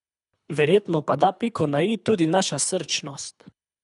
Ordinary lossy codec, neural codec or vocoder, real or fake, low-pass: none; codec, 24 kHz, 3 kbps, HILCodec; fake; 10.8 kHz